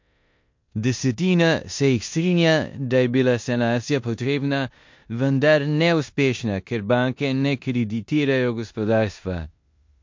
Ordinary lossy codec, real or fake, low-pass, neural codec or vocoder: MP3, 48 kbps; fake; 7.2 kHz; codec, 16 kHz in and 24 kHz out, 0.9 kbps, LongCat-Audio-Codec, four codebook decoder